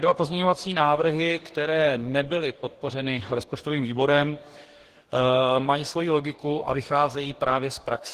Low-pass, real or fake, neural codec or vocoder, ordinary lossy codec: 14.4 kHz; fake; codec, 44.1 kHz, 2.6 kbps, DAC; Opus, 16 kbps